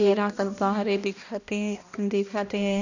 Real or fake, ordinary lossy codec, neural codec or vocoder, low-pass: fake; none; codec, 16 kHz, 1 kbps, X-Codec, HuBERT features, trained on balanced general audio; 7.2 kHz